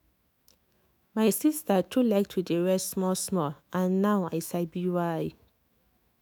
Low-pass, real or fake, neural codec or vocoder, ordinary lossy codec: none; fake; autoencoder, 48 kHz, 128 numbers a frame, DAC-VAE, trained on Japanese speech; none